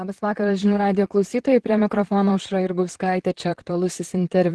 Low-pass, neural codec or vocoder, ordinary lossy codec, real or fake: 10.8 kHz; vocoder, 44.1 kHz, 128 mel bands, Pupu-Vocoder; Opus, 16 kbps; fake